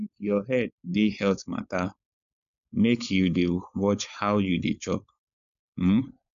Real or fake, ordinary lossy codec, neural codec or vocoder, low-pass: fake; AAC, 96 kbps; codec, 16 kHz, 4.8 kbps, FACodec; 7.2 kHz